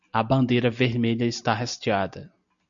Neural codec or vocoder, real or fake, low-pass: none; real; 7.2 kHz